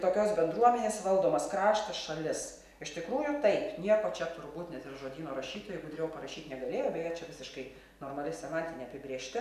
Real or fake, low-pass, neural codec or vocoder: real; 14.4 kHz; none